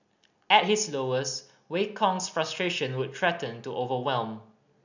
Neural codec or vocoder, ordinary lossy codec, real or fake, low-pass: none; none; real; 7.2 kHz